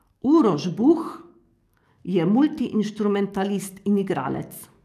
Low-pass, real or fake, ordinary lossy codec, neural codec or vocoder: 14.4 kHz; fake; none; codec, 44.1 kHz, 7.8 kbps, DAC